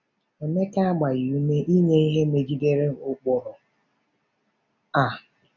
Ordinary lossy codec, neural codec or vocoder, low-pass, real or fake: none; none; 7.2 kHz; real